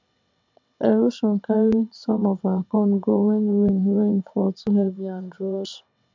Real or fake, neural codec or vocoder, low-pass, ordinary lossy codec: fake; vocoder, 22.05 kHz, 80 mel bands, WaveNeXt; 7.2 kHz; MP3, 64 kbps